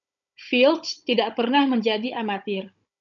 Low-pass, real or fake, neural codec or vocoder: 7.2 kHz; fake; codec, 16 kHz, 16 kbps, FunCodec, trained on Chinese and English, 50 frames a second